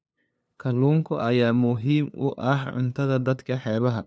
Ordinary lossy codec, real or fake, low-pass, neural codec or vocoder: none; fake; none; codec, 16 kHz, 2 kbps, FunCodec, trained on LibriTTS, 25 frames a second